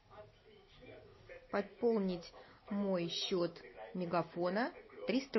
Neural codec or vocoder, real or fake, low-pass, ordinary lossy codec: none; real; 7.2 kHz; MP3, 24 kbps